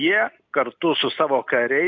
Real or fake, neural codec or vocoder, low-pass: real; none; 7.2 kHz